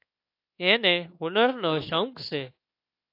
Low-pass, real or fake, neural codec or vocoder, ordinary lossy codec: 5.4 kHz; fake; codec, 24 kHz, 1.2 kbps, DualCodec; AAC, 32 kbps